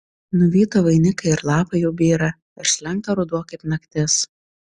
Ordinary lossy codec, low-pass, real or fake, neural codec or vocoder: Opus, 64 kbps; 9.9 kHz; real; none